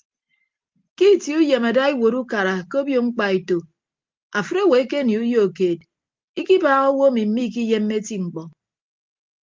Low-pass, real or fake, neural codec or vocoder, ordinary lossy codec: 7.2 kHz; real; none; Opus, 32 kbps